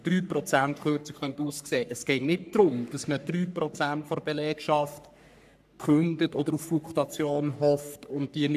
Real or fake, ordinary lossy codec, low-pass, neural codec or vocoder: fake; none; 14.4 kHz; codec, 44.1 kHz, 3.4 kbps, Pupu-Codec